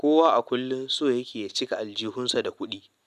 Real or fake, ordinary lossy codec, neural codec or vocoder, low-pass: real; none; none; 14.4 kHz